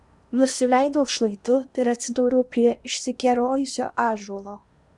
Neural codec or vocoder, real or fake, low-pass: codec, 16 kHz in and 24 kHz out, 0.8 kbps, FocalCodec, streaming, 65536 codes; fake; 10.8 kHz